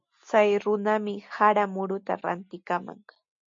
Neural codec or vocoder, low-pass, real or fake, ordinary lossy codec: none; 7.2 kHz; real; MP3, 48 kbps